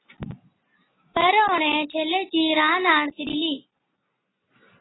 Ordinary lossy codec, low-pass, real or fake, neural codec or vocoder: AAC, 16 kbps; 7.2 kHz; real; none